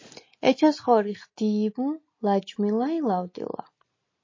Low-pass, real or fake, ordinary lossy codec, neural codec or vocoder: 7.2 kHz; real; MP3, 32 kbps; none